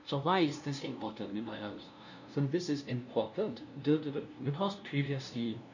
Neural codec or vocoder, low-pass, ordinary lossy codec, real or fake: codec, 16 kHz, 0.5 kbps, FunCodec, trained on LibriTTS, 25 frames a second; 7.2 kHz; none; fake